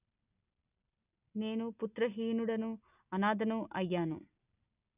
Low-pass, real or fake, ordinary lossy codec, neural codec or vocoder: 3.6 kHz; real; none; none